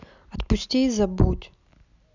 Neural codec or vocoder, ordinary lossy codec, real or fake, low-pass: none; none; real; 7.2 kHz